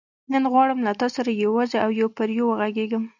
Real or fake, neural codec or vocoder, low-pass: real; none; 7.2 kHz